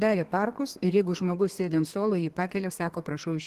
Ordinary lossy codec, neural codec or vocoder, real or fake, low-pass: Opus, 16 kbps; codec, 32 kHz, 1.9 kbps, SNAC; fake; 14.4 kHz